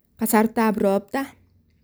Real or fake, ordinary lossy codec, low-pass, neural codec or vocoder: real; none; none; none